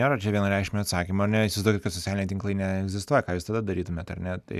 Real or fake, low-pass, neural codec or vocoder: real; 14.4 kHz; none